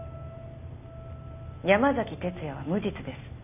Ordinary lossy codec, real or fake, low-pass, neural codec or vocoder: none; real; 3.6 kHz; none